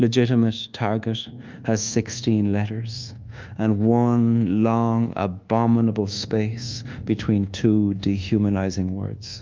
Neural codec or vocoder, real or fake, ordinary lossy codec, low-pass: codec, 24 kHz, 1.2 kbps, DualCodec; fake; Opus, 24 kbps; 7.2 kHz